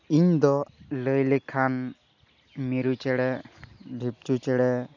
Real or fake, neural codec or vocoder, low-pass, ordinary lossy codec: real; none; 7.2 kHz; none